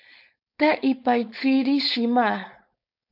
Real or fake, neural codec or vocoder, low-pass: fake; codec, 16 kHz, 4.8 kbps, FACodec; 5.4 kHz